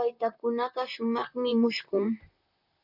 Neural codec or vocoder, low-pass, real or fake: vocoder, 44.1 kHz, 128 mel bands, Pupu-Vocoder; 5.4 kHz; fake